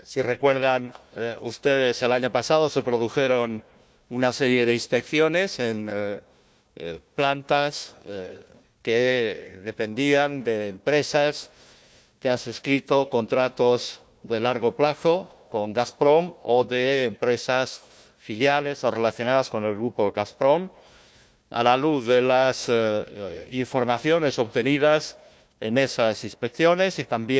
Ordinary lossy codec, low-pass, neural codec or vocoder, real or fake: none; none; codec, 16 kHz, 1 kbps, FunCodec, trained on Chinese and English, 50 frames a second; fake